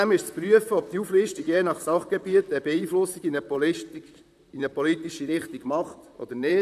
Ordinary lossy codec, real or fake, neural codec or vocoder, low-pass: none; fake; vocoder, 44.1 kHz, 128 mel bands, Pupu-Vocoder; 14.4 kHz